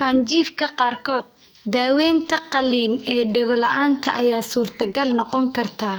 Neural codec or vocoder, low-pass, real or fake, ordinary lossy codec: codec, 44.1 kHz, 2.6 kbps, SNAC; none; fake; none